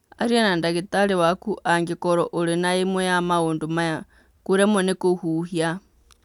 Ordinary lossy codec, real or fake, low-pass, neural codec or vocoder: none; real; 19.8 kHz; none